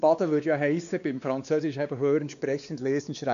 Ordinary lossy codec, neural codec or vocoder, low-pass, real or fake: none; codec, 16 kHz, 2 kbps, X-Codec, WavLM features, trained on Multilingual LibriSpeech; 7.2 kHz; fake